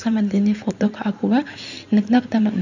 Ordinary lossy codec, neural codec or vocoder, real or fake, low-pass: none; codec, 16 kHz in and 24 kHz out, 2.2 kbps, FireRedTTS-2 codec; fake; 7.2 kHz